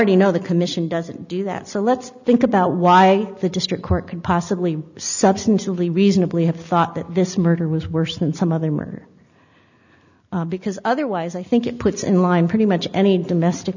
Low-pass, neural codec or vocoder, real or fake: 7.2 kHz; none; real